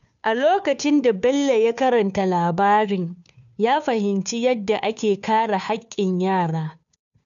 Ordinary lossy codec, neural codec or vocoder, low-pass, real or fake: none; codec, 16 kHz, 2 kbps, FunCodec, trained on Chinese and English, 25 frames a second; 7.2 kHz; fake